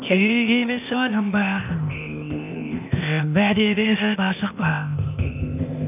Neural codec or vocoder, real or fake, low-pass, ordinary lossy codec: codec, 16 kHz, 0.8 kbps, ZipCodec; fake; 3.6 kHz; none